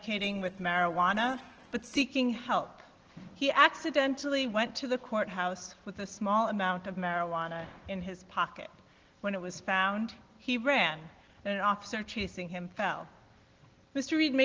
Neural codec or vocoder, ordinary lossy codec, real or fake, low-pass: none; Opus, 16 kbps; real; 7.2 kHz